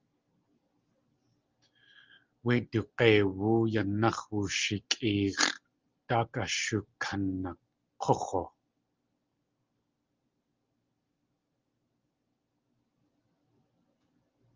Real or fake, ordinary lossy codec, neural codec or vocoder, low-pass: real; Opus, 32 kbps; none; 7.2 kHz